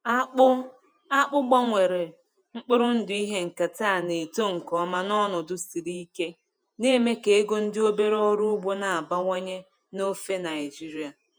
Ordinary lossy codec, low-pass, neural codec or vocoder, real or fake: none; none; vocoder, 48 kHz, 128 mel bands, Vocos; fake